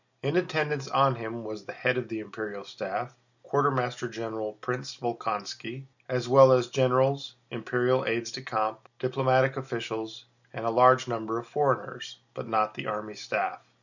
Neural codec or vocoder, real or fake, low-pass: none; real; 7.2 kHz